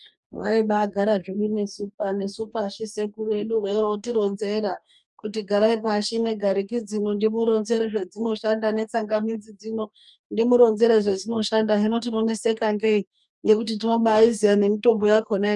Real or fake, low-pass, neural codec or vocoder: fake; 10.8 kHz; codec, 44.1 kHz, 2.6 kbps, DAC